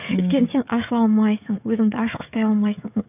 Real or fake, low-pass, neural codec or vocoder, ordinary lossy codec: fake; 3.6 kHz; codec, 16 kHz, 16 kbps, FreqCodec, smaller model; none